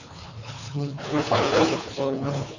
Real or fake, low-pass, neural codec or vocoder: fake; 7.2 kHz; codec, 24 kHz, 3 kbps, HILCodec